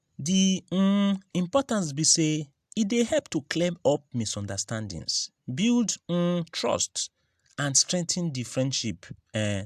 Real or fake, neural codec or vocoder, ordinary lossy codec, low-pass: real; none; AAC, 96 kbps; 14.4 kHz